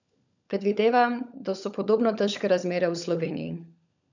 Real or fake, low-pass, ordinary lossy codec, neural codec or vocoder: fake; 7.2 kHz; none; codec, 16 kHz, 16 kbps, FunCodec, trained on LibriTTS, 50 frames a second